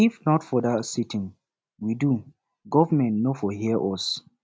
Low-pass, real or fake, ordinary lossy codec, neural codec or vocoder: none; real; none; none